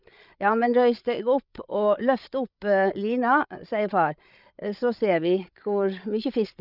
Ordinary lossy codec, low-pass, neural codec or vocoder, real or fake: none; 5.4 kHz; codec, 16 kHz, 8 kbps, FreqCodec, larger model; fake